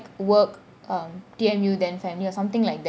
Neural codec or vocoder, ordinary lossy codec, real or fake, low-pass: none; none; real; none